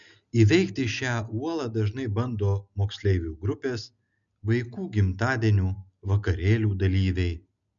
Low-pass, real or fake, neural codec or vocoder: 7.2 kHz; real; none